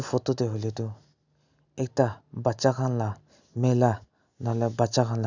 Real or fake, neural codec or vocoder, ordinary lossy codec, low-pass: real; none; none; 7.2 kHz